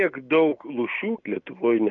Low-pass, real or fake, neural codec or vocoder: 7.2 kHz; real; none